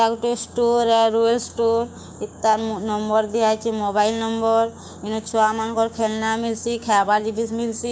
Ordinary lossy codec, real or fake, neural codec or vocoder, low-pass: none; fake; codec, 16 kHz, 6 kbps, DAC; none